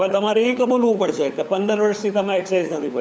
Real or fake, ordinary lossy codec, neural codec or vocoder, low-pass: fake; none; codec, 16 kHz, 4 kbps, FunCodec, trained on LibriTTS, 50 frames a second; none